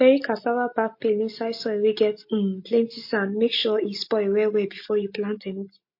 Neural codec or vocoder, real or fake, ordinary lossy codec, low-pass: none; real; MP3, 32 kbps; 5.4 kHz